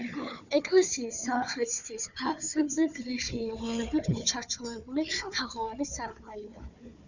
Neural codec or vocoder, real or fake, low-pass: codec, 16 kHz, 4 kbps, FunCodec, trained on Chinese and English, 50 frames a second; fake; 7.2 kHz